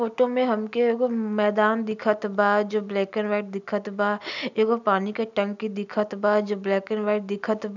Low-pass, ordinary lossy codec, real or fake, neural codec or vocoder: 7.2 kHz; none; real; none